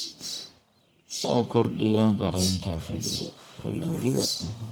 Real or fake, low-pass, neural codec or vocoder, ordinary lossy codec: fake; none; codec, 44.1 kHz, 1.7 kbps, Pupu-Codec; none